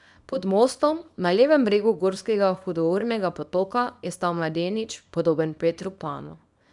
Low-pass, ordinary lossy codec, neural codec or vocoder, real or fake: 10.8 kHz; none; codec, 24 kHz, 0.9 kbps, WavTokenizer, small release; fake